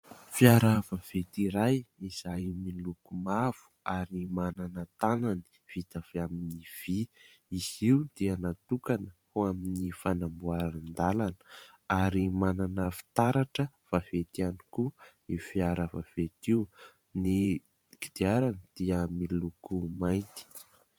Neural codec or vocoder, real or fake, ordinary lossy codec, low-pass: vocoder, 44.1 kHz, 128 mel bands every 512 samples, BigVGAN v2; fake; MP3, 96 kbps; 19.8 kHz